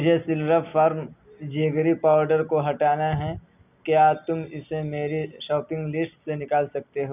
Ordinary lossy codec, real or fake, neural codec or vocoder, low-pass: none; real; none; 3.6 kHz